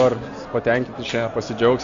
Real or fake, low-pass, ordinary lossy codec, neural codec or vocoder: real; 7.2 kHz; Opus, 64 kbps; none